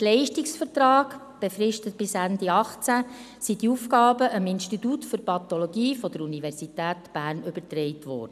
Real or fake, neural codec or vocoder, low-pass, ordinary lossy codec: real; none; 14.4 kHz; none